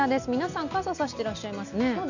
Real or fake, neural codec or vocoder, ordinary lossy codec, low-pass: real; none; none; 7.2 kHz